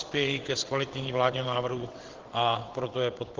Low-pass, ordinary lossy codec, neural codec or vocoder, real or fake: 7.2 kHz; Opus, 16 kbps; vocoder, 22.05 kHz, 80 mel bands, WaveNeXt; fake